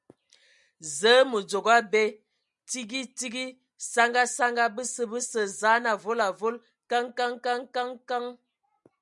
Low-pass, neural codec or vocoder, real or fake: 10.8 kHz; none; real